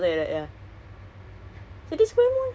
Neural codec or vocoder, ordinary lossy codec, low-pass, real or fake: none; none; none; real